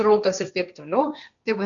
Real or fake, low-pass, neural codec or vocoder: fake; 7.2 kHz; codec, 16 kHz, 1.1 kbps, Voila-Tokenizer